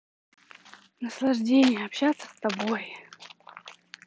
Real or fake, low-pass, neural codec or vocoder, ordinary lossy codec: real; none; none; none